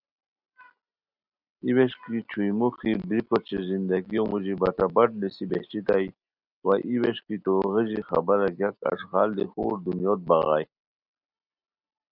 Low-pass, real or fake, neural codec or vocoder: 5.4 kHz; real; none